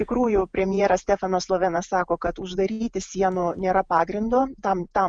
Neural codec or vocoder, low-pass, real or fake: vocoder, 48 kHz, 128 mel bands, Vocos; 9.9 kHz; fake